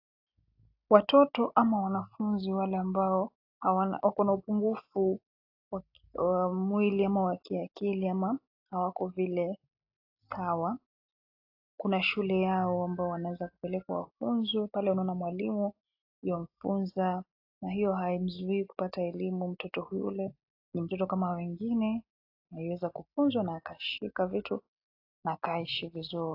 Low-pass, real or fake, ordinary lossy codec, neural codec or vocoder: 5.4 kHz; real; AAC, 32 kbps; none